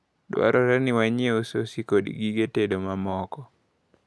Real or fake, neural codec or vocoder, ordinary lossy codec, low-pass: real; none; none; none